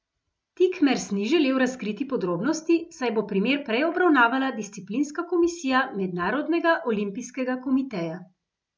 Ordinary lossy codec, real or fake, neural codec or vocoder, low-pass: none; real; none; none